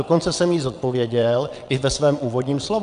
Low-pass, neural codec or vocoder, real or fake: 9.9 kHz; vocoder, 22.05 kHz, 80 mel bands, WaveNeXt; fake